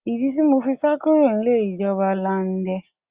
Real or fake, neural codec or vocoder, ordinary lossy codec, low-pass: real; none; Opus, 64 kbps; 3.6 kHz